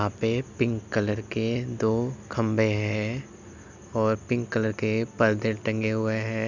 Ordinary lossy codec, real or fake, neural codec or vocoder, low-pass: none; real; none; 7.2 kHz